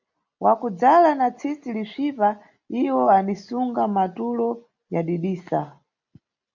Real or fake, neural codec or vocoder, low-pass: real; none; 7.2 kHz